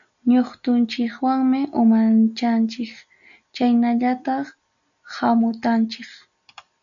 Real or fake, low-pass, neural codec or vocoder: real; 7.2 kHz; none